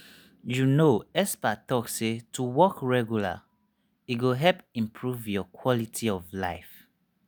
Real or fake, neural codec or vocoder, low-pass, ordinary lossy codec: real; none; none; none